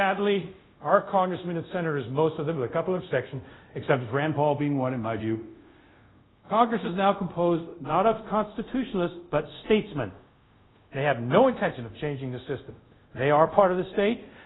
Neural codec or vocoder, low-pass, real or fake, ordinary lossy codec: codec, 24 kHz, 0.5 kbps, DualCodec; 7.2 kHz; fake; AAC, 16 kbps